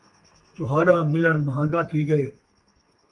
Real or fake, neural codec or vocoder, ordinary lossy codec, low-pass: fake; codec, 32 kHz, 1.9 kbps, SNAC; Opus, 32 kbps; 10.8 kHz